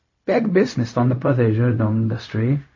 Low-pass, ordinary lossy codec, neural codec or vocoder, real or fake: 7.2 kHz; MP3, 32 kbps; codec, 16 kHz, 0.4 kbps, LongCat-Audio-Codec; fake